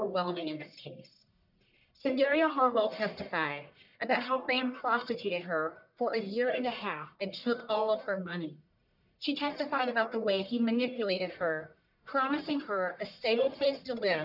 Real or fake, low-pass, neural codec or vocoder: fake; 5.4 kHz; codec, 44.1 kHz, 1.7 kbps, Pupu-Codec